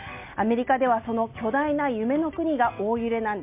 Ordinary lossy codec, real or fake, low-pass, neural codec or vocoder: MP3, 32 kbps; real; 3.6 kHz; none